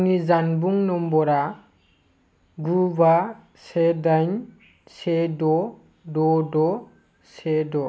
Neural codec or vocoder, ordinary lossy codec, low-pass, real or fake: none; none; none; real